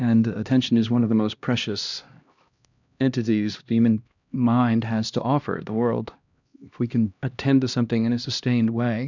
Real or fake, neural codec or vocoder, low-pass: fake; codec, 16 kHz, 1 kbps, X-Codec, HuBERT features, trained on LibriSpeech; 7.2 kHz